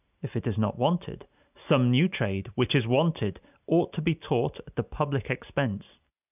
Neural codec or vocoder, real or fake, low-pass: none; real; 3.6 kHz